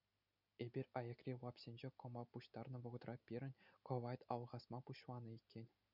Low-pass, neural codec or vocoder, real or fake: 5.4 kHz; none; real